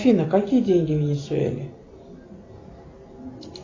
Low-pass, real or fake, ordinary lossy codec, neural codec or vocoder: 7.2 kHz; real; AAC, 32 kbps; none